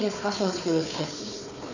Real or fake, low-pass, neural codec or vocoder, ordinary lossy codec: fake; 7.2 kHz; codec, 16 kHz, 4 kbps, FunCodec, trained on Chinese and English, 50 frames a second; none